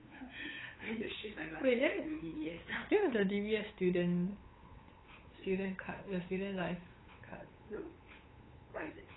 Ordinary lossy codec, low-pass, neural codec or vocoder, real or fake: AAC, 16 kbps; 7.2 kHz; codec, 16 kHz, 8 kbps, FunCodec, trained on LibriTTS, 25 frames a second; fake